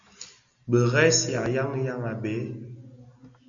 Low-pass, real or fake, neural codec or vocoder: 7.2 kHz; real; none